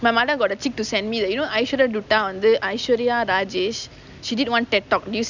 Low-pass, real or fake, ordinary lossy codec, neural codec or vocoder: 7.2 kHz; real; none; none